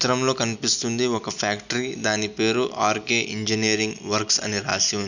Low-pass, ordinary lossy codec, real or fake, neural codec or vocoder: 7.2 kHz; none; real; none